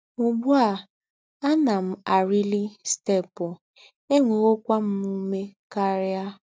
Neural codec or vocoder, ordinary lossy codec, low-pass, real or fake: none; none; none; real